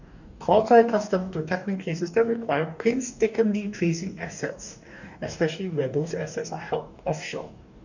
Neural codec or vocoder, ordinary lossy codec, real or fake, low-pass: codec, 44.1 kHz, 2.6 kbps, DAC; none; fake; 7.2 kHz